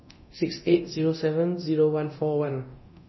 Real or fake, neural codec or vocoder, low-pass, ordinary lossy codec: fake; codec, 24 kHz, 0.9 kbps, DualCodec; 7.2 kHz; MP3, 24 kbps